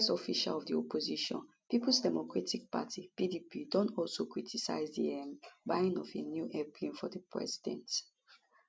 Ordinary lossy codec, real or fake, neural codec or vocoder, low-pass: none; real; none; none